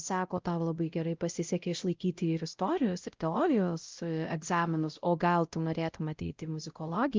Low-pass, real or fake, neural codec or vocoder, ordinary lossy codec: 7.2 kHz; fake; codec, 16 kHz, 0.5 kbps, X-Codec, WavLM features, trained on Multilingual LibriSpeech; Opus, 32 kbps